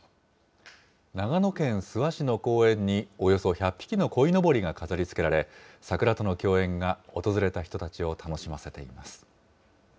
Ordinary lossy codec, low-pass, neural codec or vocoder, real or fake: none; none; none; real